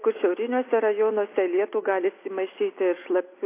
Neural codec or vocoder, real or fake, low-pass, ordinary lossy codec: none; real; 3.6 kHz; AAC, 24 kbps